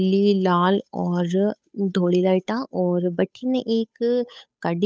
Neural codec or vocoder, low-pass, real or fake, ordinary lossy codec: codec, 16 kHz, 8 kbps, FunCodec, trained on Chinese and English, 25 frames a second; none; fake; none